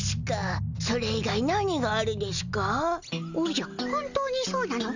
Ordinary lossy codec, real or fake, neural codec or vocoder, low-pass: none; real; none; 7.2 kHz